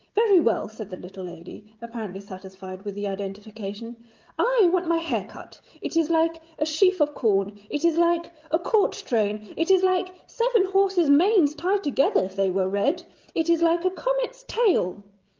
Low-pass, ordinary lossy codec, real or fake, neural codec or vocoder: 7.2 kHz; Opus, 32 kbps; fake; codec, 16 kHz, 16 kbps, FreqCodec, smaller model